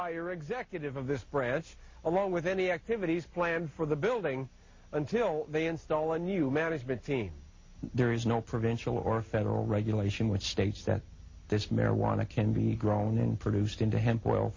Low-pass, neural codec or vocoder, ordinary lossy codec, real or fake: 7.2 kHz; none; MP3, 32 kbps; real